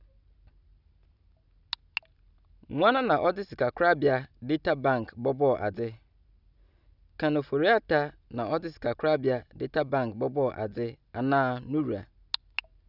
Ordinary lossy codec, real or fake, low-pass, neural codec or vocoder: none; real; 5.4 kHz; none